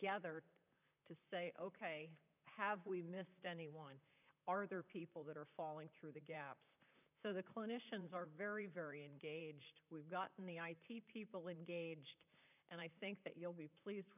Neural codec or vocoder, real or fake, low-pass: vocoder, 44.1 kHz, 128 mel bands, Pupu-Vocoder; fake; 3.6 kHz